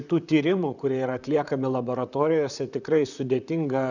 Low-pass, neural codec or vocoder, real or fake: 7.2 kHz; vocoder, 44.1 kHz, 128 mel bands, Pupu-Vocoder; fake